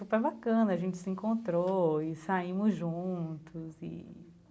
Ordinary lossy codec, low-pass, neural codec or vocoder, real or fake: none; none; none; real